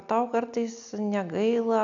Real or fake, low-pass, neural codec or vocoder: real; 7.2 kHz; none